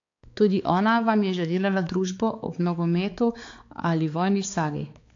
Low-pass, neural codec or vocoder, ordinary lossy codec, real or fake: 7.2 kHz; codec, 16 kHz, 4 kbps, X-Codec, HuBERT features, trained on balanced general audio; AAC, 48 kbps; fake